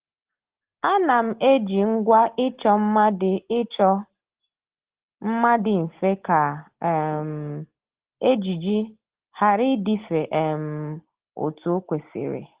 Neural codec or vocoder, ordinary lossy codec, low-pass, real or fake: none; Opus, 16 kbps; 3.6 kHz; real